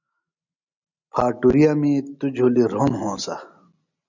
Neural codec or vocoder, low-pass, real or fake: none; 7.2 kHz; real